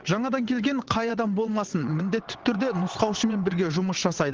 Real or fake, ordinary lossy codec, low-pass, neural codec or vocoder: fake; Opus, 16 kbps; 7.2 kHz; vocoder, 22.05 kHz, 80 mel bands, WaveNeXt